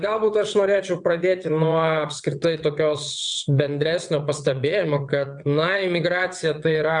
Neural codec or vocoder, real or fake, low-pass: vocoder, 22.05 kHz, 80 mel bands, WaveNeXt; fake; 9.9 kHz